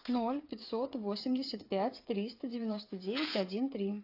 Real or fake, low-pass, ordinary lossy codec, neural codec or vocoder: fake; 5.4 kHz; AAC, 32 kbps; codec, 16 kHz, 8 kbps, FreqCodec, smaller model